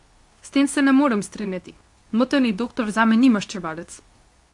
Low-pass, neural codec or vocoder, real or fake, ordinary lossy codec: 10.8 kHz; codec, 24 kHz, 0.9 kbps, WavTokenizer, medium speech release version 2; fake; AAC, 64 kbps